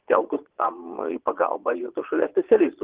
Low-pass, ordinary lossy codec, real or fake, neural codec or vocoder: 3.6 kHz; Opus, 16 kbps; fake; vocoder, 22.05 kHz, 80 mel bands, Vocos